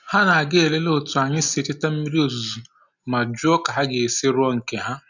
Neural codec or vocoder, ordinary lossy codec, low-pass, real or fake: none; none; 7.2 kHz; real